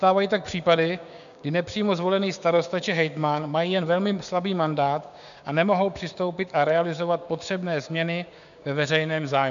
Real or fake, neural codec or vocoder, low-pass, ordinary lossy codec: fake; codec, 16 kHz, 6 kbps, DAC; 7.2 kHz; MP3, 96 kbps